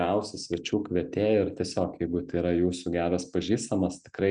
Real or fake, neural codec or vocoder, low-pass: real; none; 9.9 kHz